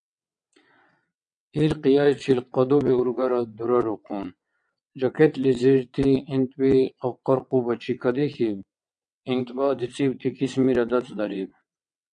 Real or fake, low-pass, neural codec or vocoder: fake; 9.9 kHz; vocoder, 22.05 kHz, 80 mel bands, WaveNeXt